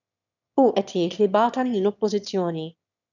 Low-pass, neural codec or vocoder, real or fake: 7.2 kHz; autoencoder, 22.05 kHz, a latent of 192 numbers a frame, VITS, trained on one speaker; fake